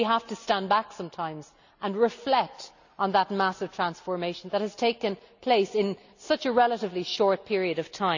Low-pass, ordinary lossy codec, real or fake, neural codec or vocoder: 7.2 kHz; none; real; none